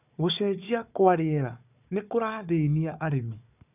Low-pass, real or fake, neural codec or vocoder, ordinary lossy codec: 3.6 kHz; fake; codec, 16 kHz, 4 kbps, FunCodec, trained on Chinese and English, 50 frames a second; none